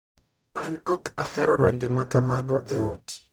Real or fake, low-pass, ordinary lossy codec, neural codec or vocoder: fake; none; none; codec, 44.1 kHz, 0.9 kbps, DAC